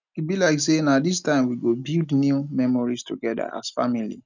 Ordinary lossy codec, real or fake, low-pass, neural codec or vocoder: none; real; 7.2 kHz; none